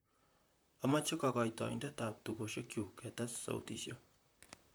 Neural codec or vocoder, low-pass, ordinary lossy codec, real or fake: vocoder, 44.1 kHz, 128 mel bands, Pupu-Vocoder; none; none; fake